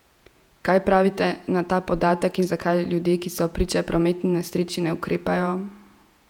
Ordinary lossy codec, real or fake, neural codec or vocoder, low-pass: none; fake; vocoder, 48 kHz, 128 mel bands, Vocos; 19.8 kHz